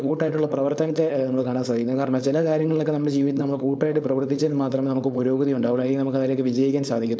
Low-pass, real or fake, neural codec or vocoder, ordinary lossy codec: none; fake; codec, 16 kHz, 4.8 kbps, FACodec; none